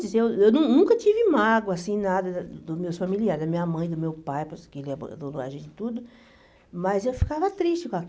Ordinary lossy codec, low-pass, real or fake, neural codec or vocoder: none; none; real; none